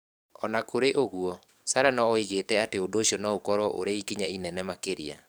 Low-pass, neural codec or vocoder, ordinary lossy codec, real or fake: none; codec, 44.1 kHz, 7.8 kbps, DAC; none; fake